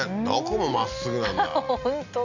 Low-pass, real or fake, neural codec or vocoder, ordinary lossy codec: 7.2 kHz; real; none; none